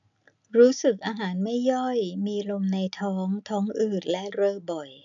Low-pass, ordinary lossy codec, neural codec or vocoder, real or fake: 7.2 kHz; none; none; real